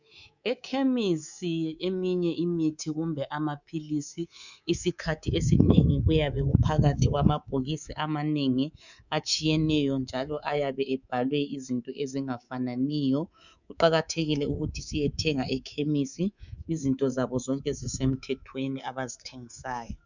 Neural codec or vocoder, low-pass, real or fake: codec, 24 kHz, 3.1 kbps, DualCodec; 7.2 kHz; fake